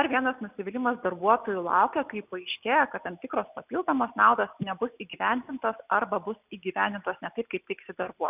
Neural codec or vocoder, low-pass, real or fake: none; 3.6 kHz; real